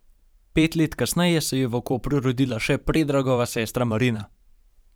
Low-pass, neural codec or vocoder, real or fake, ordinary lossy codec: none; vocoder, 44.1 kHz, 128 mel bands every 512 samples, BigVGAN v2; fake; none